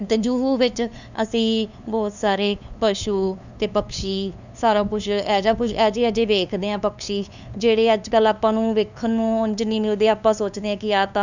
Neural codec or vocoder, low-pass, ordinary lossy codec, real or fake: codec, 16 kHz, 2 kbps, FunCodec, trained on LibriTTS, 25 frames a second; 7.2 kHz; none; fake